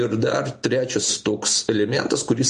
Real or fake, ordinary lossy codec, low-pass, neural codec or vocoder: real; MP3, 48 kbps; 14.4 kHz; none